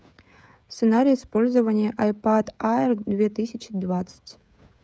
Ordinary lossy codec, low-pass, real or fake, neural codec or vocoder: none; none; fake; codec, 16 kHz, 16 kbps, FreqCodec, smaller model